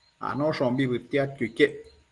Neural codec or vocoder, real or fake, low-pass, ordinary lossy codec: none; real; 10.8 kHz; Opus, 24 kbps